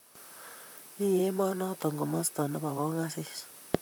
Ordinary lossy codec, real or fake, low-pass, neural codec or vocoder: none; fake; none; vocoder, 44.1 kHz, 128 mel bands, Pupu-Vocoder